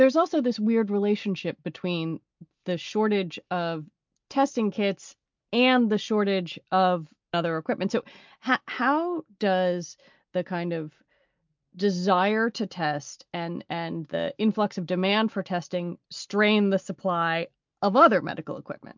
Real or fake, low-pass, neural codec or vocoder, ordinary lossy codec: real; 7.2 kHz; none; MP3, 64 kbps